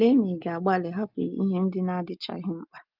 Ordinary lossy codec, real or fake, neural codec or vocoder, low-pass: Opus, 24 kbps; real; none; 5.4 kHz